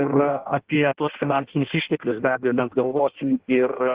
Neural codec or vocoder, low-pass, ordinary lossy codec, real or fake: codec, 16 kHz in and 24 kHz out, 0.6 kbps, FireRedTTS-2 codec; 3.6 kHz; Opus, 16 kbps; fake